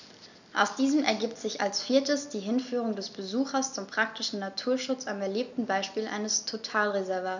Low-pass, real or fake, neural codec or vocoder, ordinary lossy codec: 7.2 kHz; real; none; none